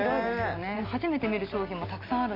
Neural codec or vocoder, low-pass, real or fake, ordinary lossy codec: none; 5.4 kHz; real; none